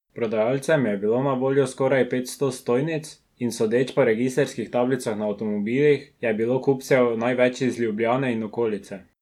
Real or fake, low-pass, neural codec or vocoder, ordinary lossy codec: real; 19.8 kHz; none; none